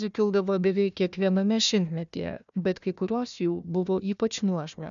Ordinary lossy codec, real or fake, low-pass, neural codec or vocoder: MP3, 96 kbps; fake; 7.2 kHz; codec, 16 kHz, 1 kbps, FunCodec, trained on Chinese and English, 50 frames a second